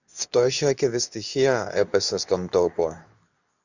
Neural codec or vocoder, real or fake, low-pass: codec, 24 kHz, 0.9 kbps, WavTokenizer, medium speech release version 2; fake; 7.2 kHz